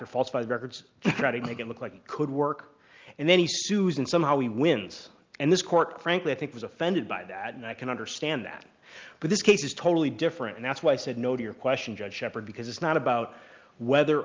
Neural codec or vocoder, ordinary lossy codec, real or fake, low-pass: none; Opus, 24 kbps; real; 7.2 kHz